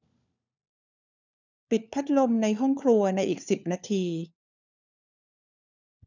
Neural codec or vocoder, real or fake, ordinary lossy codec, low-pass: codec, 16 kHz, 4 kbps, FunCodec, trained on LibriTTS, 50 frames a second; fake; none; 7.2 kHz